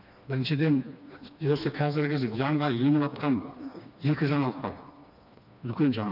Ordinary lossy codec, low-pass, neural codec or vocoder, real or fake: none; 5.4 kHz; codec, 16 kHz, 2 kbps, FreqCodec, smaller model; fake